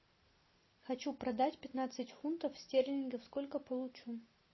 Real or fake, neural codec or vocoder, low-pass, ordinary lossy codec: real; none; 7.2 kHz; MP3, 24 kbps